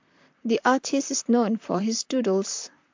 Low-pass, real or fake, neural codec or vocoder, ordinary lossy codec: 7.2 kHz; fake; vocoder, 44.1 kHz, 128 mel bands, Pupu-Vocoder; MP3, 64 kbps